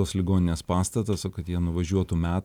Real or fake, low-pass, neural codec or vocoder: real; 19.8 kHz; none